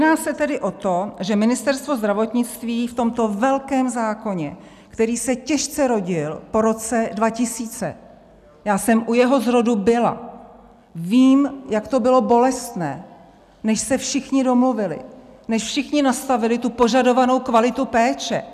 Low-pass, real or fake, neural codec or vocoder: 14.4 kHz; real; none